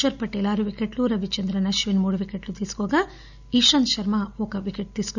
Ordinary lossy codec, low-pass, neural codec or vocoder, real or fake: none; 7.2 kHz; none; real